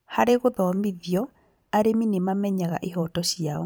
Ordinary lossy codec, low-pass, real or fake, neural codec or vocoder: none; none; fake; vocoder, 44.1 kHz, 128 mel bands every 512 samples, BigVGAN v2